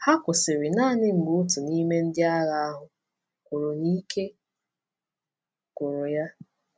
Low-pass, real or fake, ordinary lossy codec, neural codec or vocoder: none; real; none; none